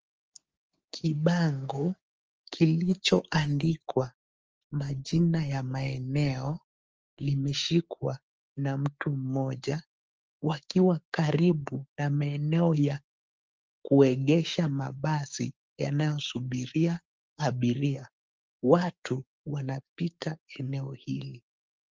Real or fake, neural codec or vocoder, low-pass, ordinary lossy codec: fake; codec, 16 kHz, 6 kbps, DAC; 7.2 kHz; Opus, 24 kbps